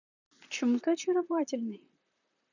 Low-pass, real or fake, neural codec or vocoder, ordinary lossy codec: 7.2 kHz; fake; vocoder, 44.1 kHz, 128 mel bands, Pupu-Vocoder; none